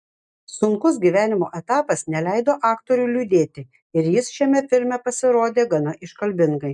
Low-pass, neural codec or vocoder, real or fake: 10.8 kHz; none; real